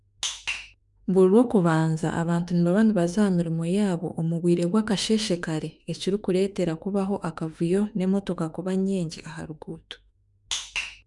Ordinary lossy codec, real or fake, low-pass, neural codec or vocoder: none; fake; 10.8 kHz; autoencoder, 48 kHz, 32 numbers a frame, DAC-VAE, trained on Japanese speech